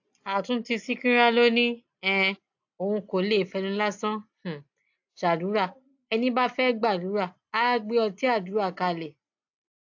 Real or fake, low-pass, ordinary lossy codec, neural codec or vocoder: real; 7.2 kHz; AAC, 48 kbps; none